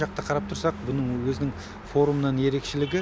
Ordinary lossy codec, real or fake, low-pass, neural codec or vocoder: none; real; none; none